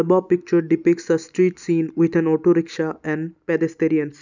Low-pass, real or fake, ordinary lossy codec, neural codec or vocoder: 7.2 kHz; real; none; none